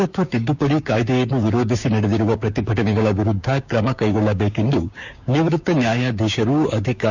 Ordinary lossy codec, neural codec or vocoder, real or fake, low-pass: none; codec, 16 kHz, 6 kbps, DAC; fake; 7.2 kHz